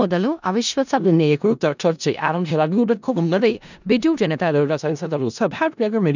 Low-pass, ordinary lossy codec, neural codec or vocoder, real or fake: 7.2 kHz; none; codec, 16 kHz in and 24 kHz out, 0.4 kbps, LongCat-Audio-Codec, four codebook decoder; fake